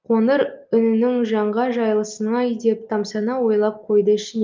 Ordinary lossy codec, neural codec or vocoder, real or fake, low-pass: Opus, 24 kbps; none; real; 7.2 kHz